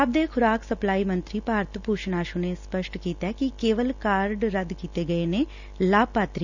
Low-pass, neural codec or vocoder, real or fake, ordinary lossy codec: 7.2 kHz; none; real; none